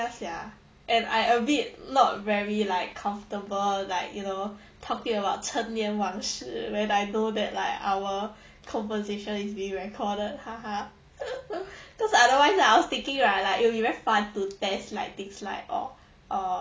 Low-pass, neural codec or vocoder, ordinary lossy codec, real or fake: none; none; none; real